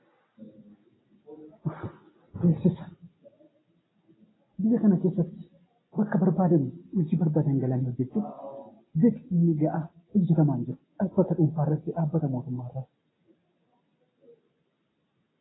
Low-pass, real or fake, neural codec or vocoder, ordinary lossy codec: 7.2 kHz; real; none; AAC, 16 kbps